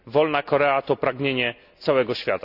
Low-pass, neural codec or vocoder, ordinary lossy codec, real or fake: 5.4 kHz; none; none; real